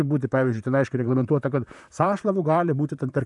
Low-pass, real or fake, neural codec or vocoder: 10.8 kHz; fake; vocoder, 44.1 kHz, 128 mel bands, Pupu-Vocoder